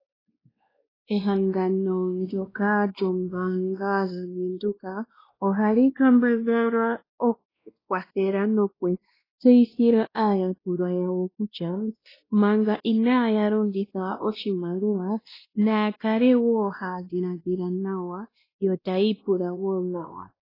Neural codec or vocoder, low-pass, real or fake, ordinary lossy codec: codec, 16 kHz, 1 kbps, X-Codec, WavLM features, trained on Multilingual LibriSpeech; 5.4 kHz; fake; AAC, 24 kbps